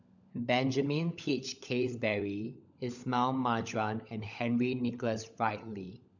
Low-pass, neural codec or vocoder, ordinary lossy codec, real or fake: 7.2 kHz; codec, 16 kHz, 16 kbps, FunCodec, trained on LibriTTS, 50 frames a second; none; fake